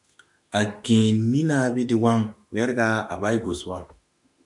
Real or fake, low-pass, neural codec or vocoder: fake; 10.8 kHz; autoencoder, 48 kHz, 32 numbers a frame, DAC-VAE, trained on Japanese speech